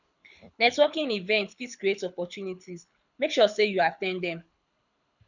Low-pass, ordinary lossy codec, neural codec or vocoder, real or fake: 7.2 kHz; none; codec, 24 kHz, 6 kbps, HILCodec; fake